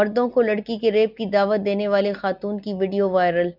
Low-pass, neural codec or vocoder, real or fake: 5.4 kHz; none; real